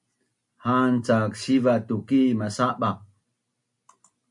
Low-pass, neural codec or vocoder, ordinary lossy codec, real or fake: 10.8 kHz; none; MP3, 64 kbps; real